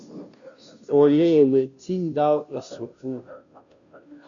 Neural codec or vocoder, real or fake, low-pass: codec, 16 kHz, 0.5 kbps, FunCodec, trained on Chinese and English, 25 frames a second; fake; 7.2 kHz